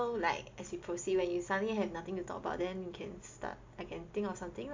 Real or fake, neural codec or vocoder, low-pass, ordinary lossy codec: real; none; 7.2 kHz; MP3, 48 kbps